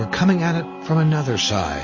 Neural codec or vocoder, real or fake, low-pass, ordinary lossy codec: none; real; 7.2 kHz; MP3, 32 kbps